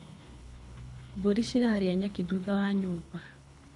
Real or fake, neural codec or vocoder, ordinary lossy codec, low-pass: fake; codec, 24 kHz, 3 kbps, HILCodec; AAC, 64 kbps; 10.8 kHz